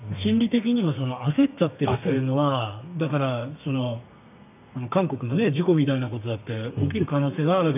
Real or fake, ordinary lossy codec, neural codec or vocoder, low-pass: fake; none; codec, 32 kHz, 1.9 kbps, SNAC; 3.6 kHz